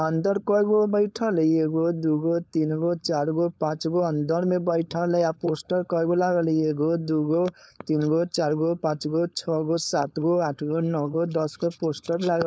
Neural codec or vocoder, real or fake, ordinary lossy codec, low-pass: codec, 16 kHz, 4.8 kbps, FACodec; fake; none; none